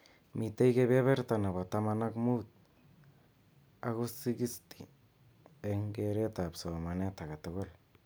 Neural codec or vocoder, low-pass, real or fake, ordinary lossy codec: none; none; real; none